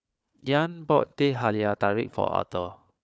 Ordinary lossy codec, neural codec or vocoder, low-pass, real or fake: none; codec, 16 kHz, 4 kbps, FunCodec, trained on Chinese and English, 50 frames a second; none; fake